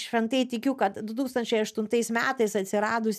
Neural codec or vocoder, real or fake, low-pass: none; real; 14.4 kHz